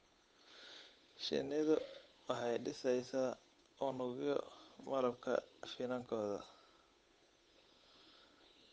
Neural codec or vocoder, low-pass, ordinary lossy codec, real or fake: codec, 16 kHz, 8 kbps, FunCodec, trained on Chinese and English, 25 frames a second; none; none; fake